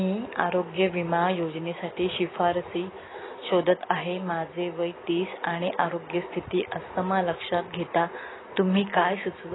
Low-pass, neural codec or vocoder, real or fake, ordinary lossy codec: 7.2 kHz; none; real; AAC, 16 kbps